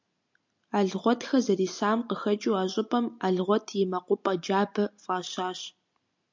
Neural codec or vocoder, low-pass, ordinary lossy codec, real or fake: none; 7.2 kHz; MP3, 64 kbps; real